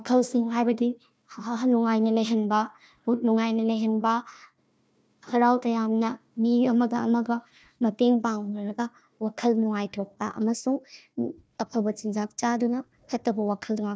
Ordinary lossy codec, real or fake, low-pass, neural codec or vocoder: none; fake; none; codec, 16 kHz, 1 kbps, FunCodec, trained on Chinese and English, 50 frames a second